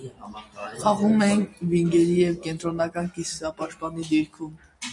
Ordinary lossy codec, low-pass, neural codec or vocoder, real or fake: MP3, 96 kbps; 10.8 kHz; none; real